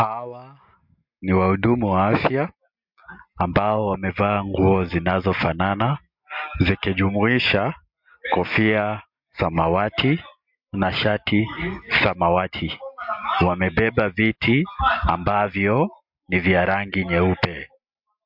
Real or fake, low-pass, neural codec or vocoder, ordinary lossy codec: real; 5.4 kHz; none; MP3, 48 kbps